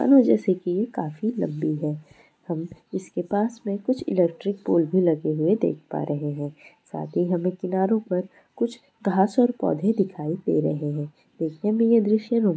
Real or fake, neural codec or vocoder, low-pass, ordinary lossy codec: real; none; none; none